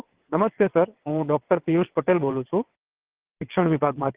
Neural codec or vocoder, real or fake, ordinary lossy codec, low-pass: vocoder, 22.05 kHz, 80 mel bands, WaveNeXt; fake; Opus, 16 kbps; 3.6 kHz